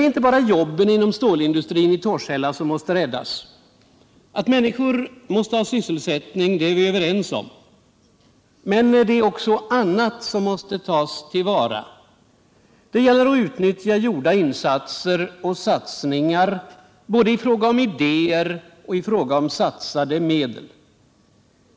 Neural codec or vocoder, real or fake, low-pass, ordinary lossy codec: none; real; none; none